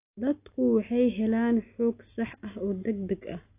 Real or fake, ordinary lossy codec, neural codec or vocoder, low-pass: real; AAC, 24 kbps; none; 3.6 kHz